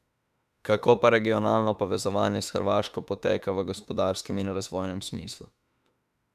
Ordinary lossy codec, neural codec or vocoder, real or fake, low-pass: none; autoencoder, 48 kHz, 32 numbers a frame, DAC-VAE, trained on Japanese speech; fake; 14.4 kHz